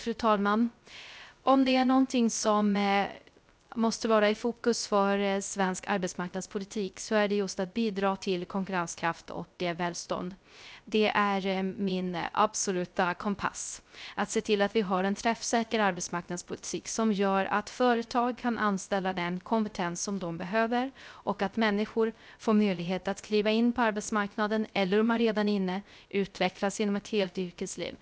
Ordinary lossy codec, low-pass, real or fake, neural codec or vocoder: none; none; fake; codec, 16 kHz, 0.3 kbps, FocalCodec